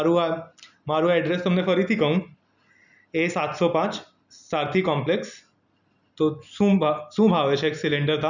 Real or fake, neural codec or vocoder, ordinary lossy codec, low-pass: real; none; none; 7.2 kHz